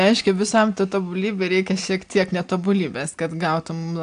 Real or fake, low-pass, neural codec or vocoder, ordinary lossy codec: real; 9.9 kHz; none; AAC, 64 kbps